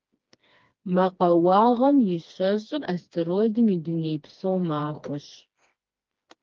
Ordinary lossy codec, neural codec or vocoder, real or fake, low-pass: Opus, 24 kbps; codec, 16 kHz, 2 kbps, FreqCodec, smaller model; fake; 7.2 kHz